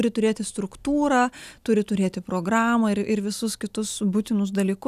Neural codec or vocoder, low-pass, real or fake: none; 14.4 kHz; real